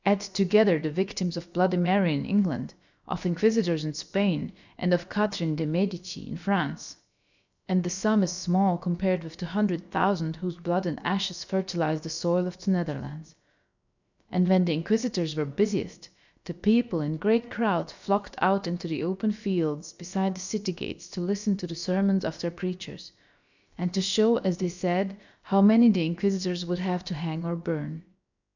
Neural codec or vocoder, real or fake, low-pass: codec, 16 kHz, about 1 kbps, DyCAST, with the encoder's durations; fake; 7.2 kHz